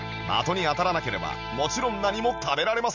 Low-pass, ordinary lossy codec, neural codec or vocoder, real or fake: 7.2 kHz; none; none; real